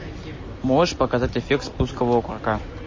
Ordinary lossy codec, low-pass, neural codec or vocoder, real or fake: MP3, 32 kbps; 7.2 kHz; none; real